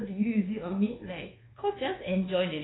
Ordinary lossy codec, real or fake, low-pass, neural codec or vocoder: AAC, 16 kbps; fake; 7.2 kHz; codec, 24 kHz, 1.2 kbps, DualCodec